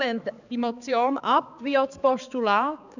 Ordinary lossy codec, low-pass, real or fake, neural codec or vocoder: none; 7.2 kHz; fake; codec, 16 kHz, 4 kbps, X-Codec, HuBERT features, trained on balanced general audio